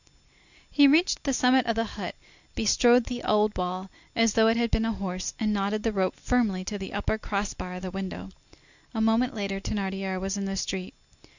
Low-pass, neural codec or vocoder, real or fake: 7.2 kHz; none; real